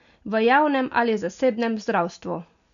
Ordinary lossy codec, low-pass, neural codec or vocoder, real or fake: none; 7.2 kHz; none; real